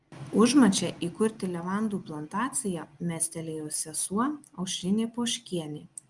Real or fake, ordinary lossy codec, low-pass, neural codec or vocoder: real; Opus, 32 kbps; 10.8 kHz; none